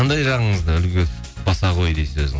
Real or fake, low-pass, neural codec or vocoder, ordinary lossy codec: real; none; none; none